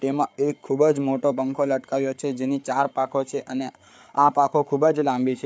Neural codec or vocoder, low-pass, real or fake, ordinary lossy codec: codec, 16 kHz, 8 kbps, FreqCodec, larger model; none; fake; none